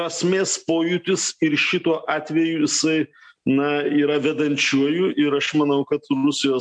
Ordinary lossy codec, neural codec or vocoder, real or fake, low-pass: MP3, 64 kbps; none; real; 9.9 kHz